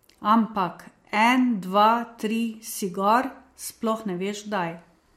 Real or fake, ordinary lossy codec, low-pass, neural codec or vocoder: real; MP3, 64 kbps; 19.8 kHz; none